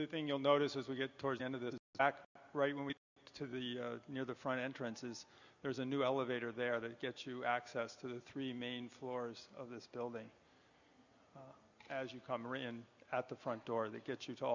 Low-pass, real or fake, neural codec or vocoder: 7.2 kHz; real; none